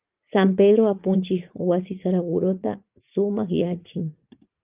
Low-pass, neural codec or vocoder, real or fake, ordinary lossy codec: 3.6 kHz; vocoder, 44.1 kHz, 80 mel bands, Vocos; fake; Opus, 24 kbps